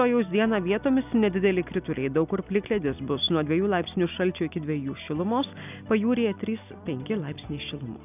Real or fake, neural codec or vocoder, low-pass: real; none; 3.6 kHz